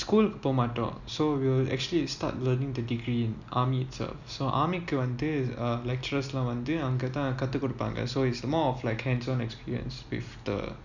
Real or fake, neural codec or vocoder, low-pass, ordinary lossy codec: real; none; 7.2 kHz; none